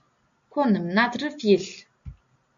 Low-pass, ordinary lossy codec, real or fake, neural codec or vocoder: 7.2 kHz; AAC, 64 kbps; real; none